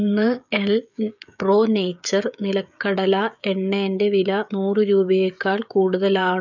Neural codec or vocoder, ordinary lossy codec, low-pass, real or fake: codec, 16 kHz, 16 kbps, FreqCodec, smaller model; none; 7.2 kHz; fake